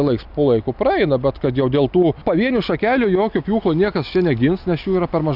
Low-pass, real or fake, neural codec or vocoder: 5.4 kHz; real; none